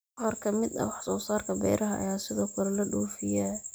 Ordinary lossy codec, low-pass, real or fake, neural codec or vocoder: none; none; real; none